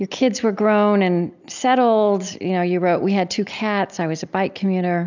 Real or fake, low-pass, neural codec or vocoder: real; 7.2 kHz; none